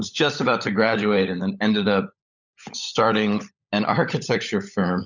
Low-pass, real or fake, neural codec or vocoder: 7.2 kHz; fake; codec, 16 kHz, 16 kbps, FunCodec, trained on LibriTTS, 50 frames a second